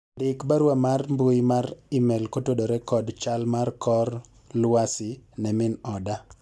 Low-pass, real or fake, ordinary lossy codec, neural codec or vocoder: none; real; none; none